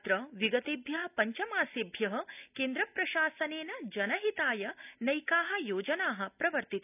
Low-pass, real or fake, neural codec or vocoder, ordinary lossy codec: 3.6 kHz; real; none; none